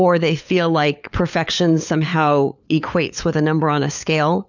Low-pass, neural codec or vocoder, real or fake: 7.2 kHz; codec, 16 kHz, 4 kbps, FunCodec, trained on LibriTTS, 50 frames a second; fake